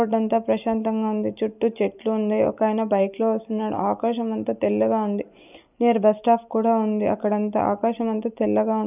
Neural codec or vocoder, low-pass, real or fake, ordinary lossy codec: none; 3.6 kHz; real; none